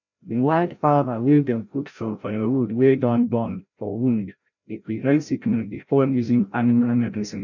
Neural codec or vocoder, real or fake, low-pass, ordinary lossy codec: codec, 16 kHz, 0.5 kbps, FreqCodec, larger model; fake; 7.2 kHz; none